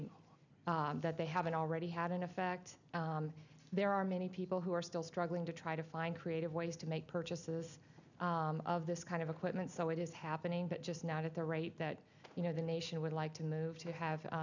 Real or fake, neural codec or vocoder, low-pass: real; none; 7.2 kHz